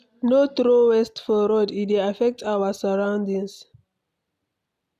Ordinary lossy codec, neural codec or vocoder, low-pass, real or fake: none; none; 14.4 kHz; real